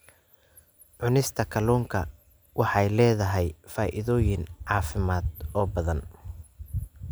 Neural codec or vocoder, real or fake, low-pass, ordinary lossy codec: none; real; none; none